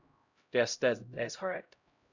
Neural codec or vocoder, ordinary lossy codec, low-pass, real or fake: codec, 16 kHz, 0.5 kbps, X-Codec, HuBERT features, trained on LibriSpeech; none; 7.2 kHz; fake